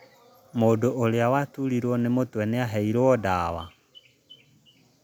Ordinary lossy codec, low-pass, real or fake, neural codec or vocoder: none; none; real; none